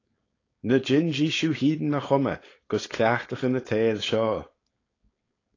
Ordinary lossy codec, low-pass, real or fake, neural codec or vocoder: AAC, 32 kbps; 7.2 kHz; fake; codec, 16 kHz, 4.8 kbps, FACodec